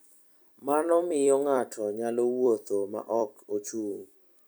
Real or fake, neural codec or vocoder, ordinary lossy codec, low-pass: fake; vocoder, 44.1 kHz, 128 mel bands every 256 samples, BigVGAN v2; none; none